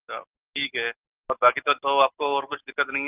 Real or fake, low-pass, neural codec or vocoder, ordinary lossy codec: real; 3.6 kHz; none; Opus, 32 kbps